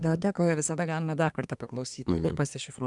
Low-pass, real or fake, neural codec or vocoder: 10.8 kHz; fake; codec, 24 kHz, 1 kbps, SNAC